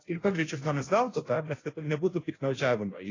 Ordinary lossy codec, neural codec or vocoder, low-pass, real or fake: AAC, 32 kbps; codec, 16 kHz, 0.5 kbps, FunCodec, trained on Chinese and English, 25 frames a second; 7.2 kHz; fake